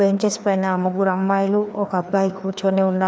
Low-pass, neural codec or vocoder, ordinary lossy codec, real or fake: none; codec, 16 kHz, 2 kbps, FreqCodec, larger model; none; fake